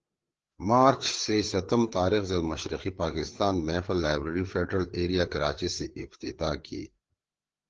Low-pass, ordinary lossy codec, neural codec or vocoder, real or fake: 7.2 kHz; Opus, 16 kbps; codec, 16 kHz, 4 kbps, FreqCodec, larger model; fake